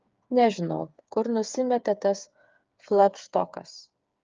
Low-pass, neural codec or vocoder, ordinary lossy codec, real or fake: 7.2 kHz; codec, 16 kHz, 8 kbps, FreqCodec, smaller model; Opus, 24 kbps; fake